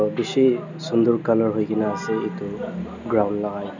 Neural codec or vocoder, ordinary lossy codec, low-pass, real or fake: none; none; 7.2 kHz; real